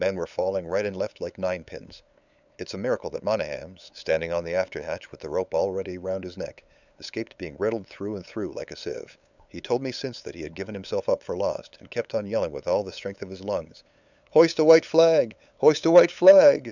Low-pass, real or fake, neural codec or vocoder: 7.2 kHz; fake; codec, 16 kHz, 8 kbps, FunCodec, trained on LibriTTS, 25 frames a second